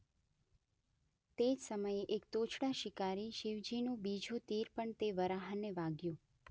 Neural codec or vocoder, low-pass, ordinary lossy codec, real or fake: none; none; none; real